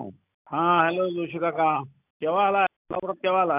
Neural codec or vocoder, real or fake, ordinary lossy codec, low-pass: none; real; none; 3.6 kHz